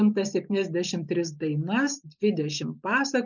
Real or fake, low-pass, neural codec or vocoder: real; 7.2 kHz; none